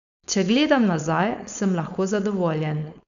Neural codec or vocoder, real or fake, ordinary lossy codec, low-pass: codec, 16 kHz, 4.8 kbps, FACodec; fake; none; 7.2 kHz